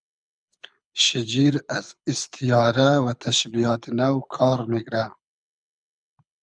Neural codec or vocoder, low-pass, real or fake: codec, 24 kHz, 6 kbps, HILCodec; 9.9 kHz; fake